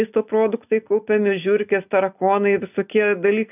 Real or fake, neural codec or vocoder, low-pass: real; none; 3.6 kHz